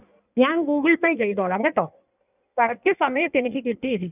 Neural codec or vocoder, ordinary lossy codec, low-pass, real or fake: codec, 16 kHz in and 24 kHz out, 1.1 kbps, FireRedTTS-2 codec; none; 3.6 kHz; fake